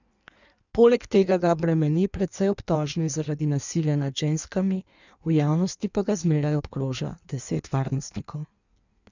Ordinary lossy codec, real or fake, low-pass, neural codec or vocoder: none; fake; 7.2 kHz; codec, 16 kHz in and 24 kHz out, 1.1 kbps, FireRedTTS-2 codec